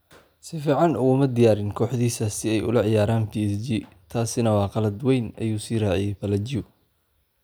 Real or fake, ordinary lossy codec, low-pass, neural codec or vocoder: real; none; none; none